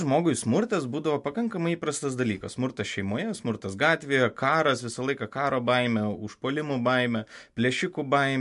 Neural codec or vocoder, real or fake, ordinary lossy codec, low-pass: none; real; MP3, 64 kbps; 10.8 kHz